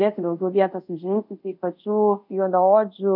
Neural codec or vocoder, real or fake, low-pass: codec, 24 kHz, 0.5 kbps, DualCodec; fake; 5.4 kHz